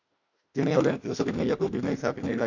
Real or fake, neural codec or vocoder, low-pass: fake; autoencoder, 48 kHz, 32 numbers a frame, DAC-VAE, trained on Japanese speech; 7.2 kHz